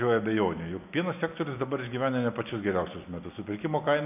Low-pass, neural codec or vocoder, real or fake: 3.6 kHz; none; real